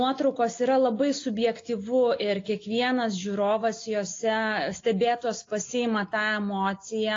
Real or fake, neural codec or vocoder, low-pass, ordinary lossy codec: real; none; 7.2 kHz; AAC, 32 kbps